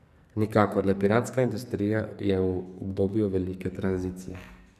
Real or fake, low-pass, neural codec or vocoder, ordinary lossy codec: fake; 14.4 kHz; codec, 44.1 kHz, 2.6 kbps, SNAC; none